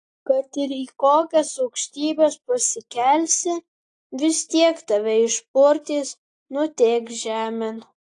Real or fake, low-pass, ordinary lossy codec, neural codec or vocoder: real; 9.9 kHz; AAC, 48 kbps; none